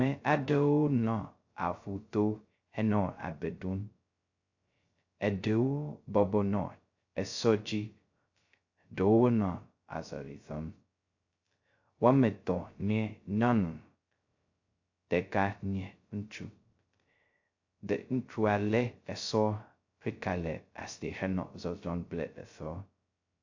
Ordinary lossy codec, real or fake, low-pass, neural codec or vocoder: AAC, 48 kbps; fake; 7.2 kHz; codec, 16 kHz, 0.2 kbps, FocalCodec